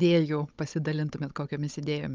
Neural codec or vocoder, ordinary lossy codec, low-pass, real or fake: codec, 16 kHz, 16 kbps, FunCodec, trained on Chinese and English, 50 frames a second; Opus, 24 kbps; 7.2 kHz; fake